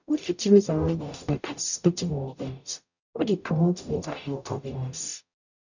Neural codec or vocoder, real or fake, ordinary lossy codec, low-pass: codec, 44.1 kHz, 0.9 kbps, DAC; fake; none; 7.2 kHz